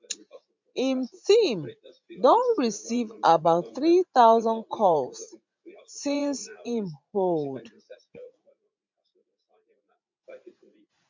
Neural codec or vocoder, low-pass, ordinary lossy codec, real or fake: vocoder, 44.1 kHz, 80 mel bands, Vocos; 7.2 kHz; MP3, 64 kbps; fake